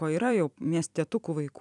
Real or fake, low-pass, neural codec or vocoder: fake; 10.8 kHz; vocoder, 44.1 kHz, 128 mel bands every 256 samples, BigVGAN v2